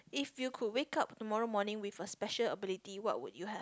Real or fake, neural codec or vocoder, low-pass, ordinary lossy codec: real; none; none; none